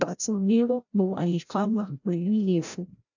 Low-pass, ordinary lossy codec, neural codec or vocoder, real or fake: 7.2 kHz; none; codec, 16 kHz, 0.5 kbps, FreqCodec, larger model; fake